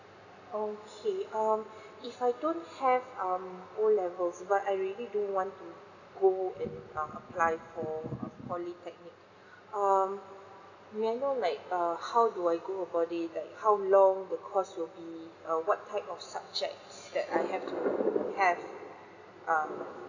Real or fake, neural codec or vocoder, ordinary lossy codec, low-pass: real; none; none; 7.2 kHz